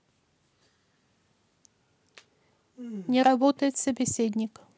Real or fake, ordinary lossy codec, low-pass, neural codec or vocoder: real; none; none; none